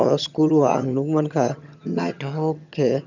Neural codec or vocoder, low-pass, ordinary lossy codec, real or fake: vocoder, 22.05 kHz, 80 mel bands, HiFi-GAN; 7.2 kHz; none; fake